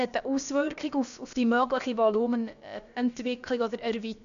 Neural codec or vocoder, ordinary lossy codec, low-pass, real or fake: codec, 16 kHz, about 1 kbps, DyCAST, with the encoder's durations; none; 7.2 kHz; fake